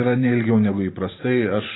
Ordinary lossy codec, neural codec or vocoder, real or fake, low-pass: AAC, 16 kbps; vocoder, 44.1 kHz, 128 mel bands every 512 samples, BigVGAN v2; fake; 7.2 kHz